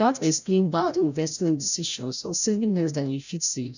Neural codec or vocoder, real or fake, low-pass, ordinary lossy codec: codec, 16 kHz, 0.5 kbps, FreqCodec, larger model; fake; 7.2 kHz; none